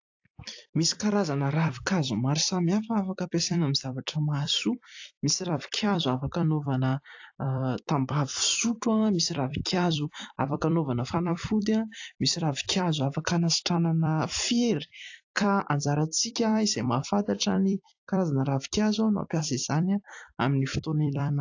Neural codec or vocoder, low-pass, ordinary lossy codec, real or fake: vocoder, 44.1 kHz, 128 mel bands every 256 samples, BigVGAN v2; 7.2 kHz; AAC, 48 kbps; fake